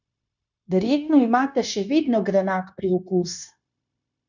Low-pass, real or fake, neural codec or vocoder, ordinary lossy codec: 7.2 kHz; fake; codec, 16 kHz, 0.9 kbps, LongCat-Audio-Codec; none